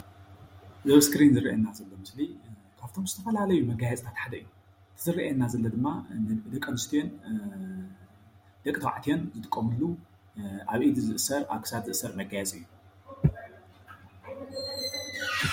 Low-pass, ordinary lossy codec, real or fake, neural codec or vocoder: 19.8 kHz; MP3, 64 kbps; real; none